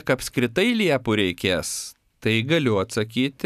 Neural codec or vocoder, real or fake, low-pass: none; real; 14.4 kHz